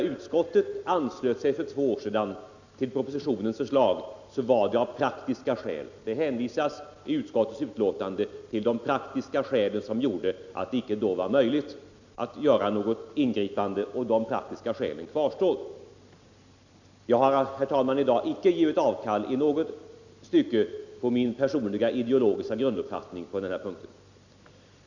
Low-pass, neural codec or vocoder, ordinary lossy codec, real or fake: 7.2 kHz; none; none; real